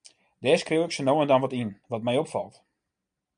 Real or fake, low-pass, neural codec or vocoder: real; 9.9 kHz; none